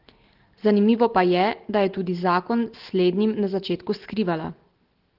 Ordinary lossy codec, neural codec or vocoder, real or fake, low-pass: Opus, 16 kbps; none; real; 5.4 kHz